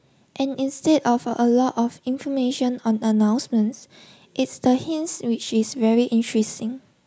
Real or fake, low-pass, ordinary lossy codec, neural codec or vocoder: real; none; none; none